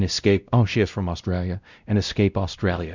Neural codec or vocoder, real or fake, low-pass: codec, 16 kHz, 0.5 kbps, X-Codec, WavLM features, trained on Multilingual LibriSpeech; fake; 7.2 kHz